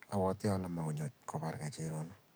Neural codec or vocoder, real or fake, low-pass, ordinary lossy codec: codec, 44.1 kHz, 7.8 kbps, DAC; fake; none; none